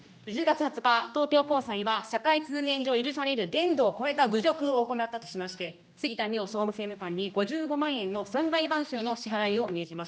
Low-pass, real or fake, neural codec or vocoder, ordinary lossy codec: none; fake; codec, 16 kHz, 1 kbps, X-Codec, HuBERT features, trained on general audio; none